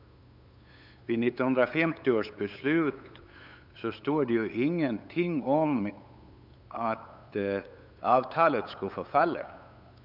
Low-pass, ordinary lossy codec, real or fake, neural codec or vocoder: 5.4 kHz; none; fake; codec, 16 kHz, 8 kbps, FunCodec, trained on LibriTTS, 25 frames a second